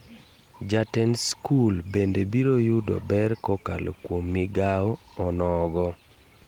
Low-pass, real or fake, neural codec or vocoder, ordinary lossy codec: 19.8 kHz; real; none; Opus, 24 kbps